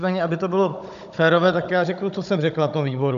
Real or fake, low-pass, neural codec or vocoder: fake; 7.2 kHz; codec, 16 kHz, 16 kbps, FunCodec, trained on Chinese and English, 50 frames a second